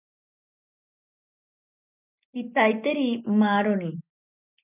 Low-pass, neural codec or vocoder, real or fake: 3.6 kHz; none; real